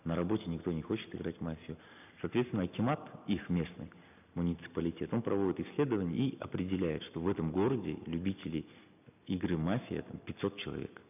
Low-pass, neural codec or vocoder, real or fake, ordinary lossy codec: 3.6 kHz; none; real; none